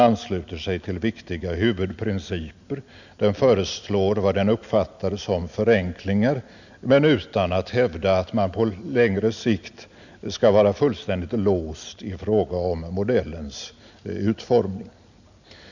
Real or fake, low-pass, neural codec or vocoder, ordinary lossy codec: real; 7.2 kHz; none; none